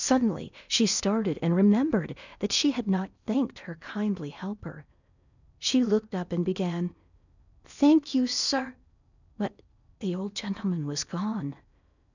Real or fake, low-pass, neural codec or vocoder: fake; 7.2 kHz; codec, 16 kHz in and 24 kHz out, 0.8 kbps, FocalCodec, streaming, 65536 codes